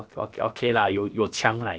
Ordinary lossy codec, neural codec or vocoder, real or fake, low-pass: none; codec, 16 kHz, about 1 kbps, DyCAST, with the encoder's durations; fake; none